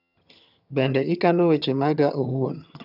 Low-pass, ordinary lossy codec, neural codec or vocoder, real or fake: 5.4 kHz; none; vocoder, 22.05 kHz, 80 mel bands, HiFi-GAN; fake